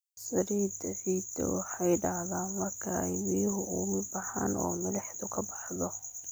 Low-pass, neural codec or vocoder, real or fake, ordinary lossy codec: none; none; real; none